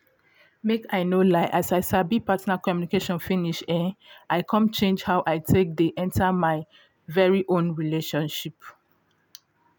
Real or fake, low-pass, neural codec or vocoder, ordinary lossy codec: real; none; none; none